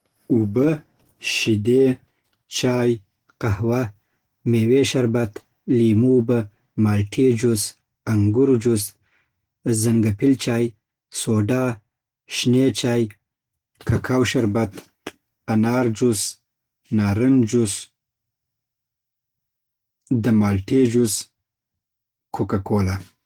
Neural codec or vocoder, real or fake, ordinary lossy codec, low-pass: none; real; Opus, 16 kbps; 19.8 kHz